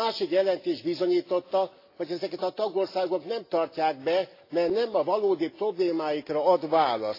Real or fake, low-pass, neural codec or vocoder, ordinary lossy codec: real; 5.4 kHz; none; AAC, 32 kbps